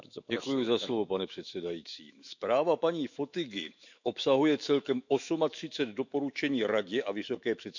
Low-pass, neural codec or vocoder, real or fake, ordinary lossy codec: 7.2 kHz; autoencoder, 48 kHz, 128 numbers a frame, DAC-VAE, trained on Japanese speech; fake; none